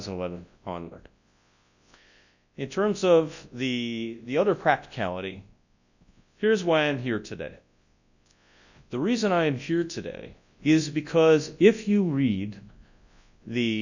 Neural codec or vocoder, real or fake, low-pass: codec, 24 kHz, 0.9 kbps, WavTokenizer, large speech release; fake; 7.2 kHz